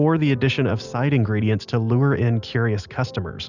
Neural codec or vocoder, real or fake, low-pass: none; real; 7.2 kHz